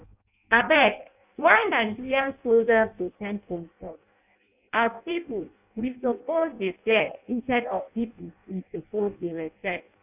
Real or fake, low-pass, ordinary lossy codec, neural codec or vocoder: fake; 3.6 kHz; none; codec, 16 kHz in and 24 kHz out, 0.6 kbps, FireRedTTS-2 codec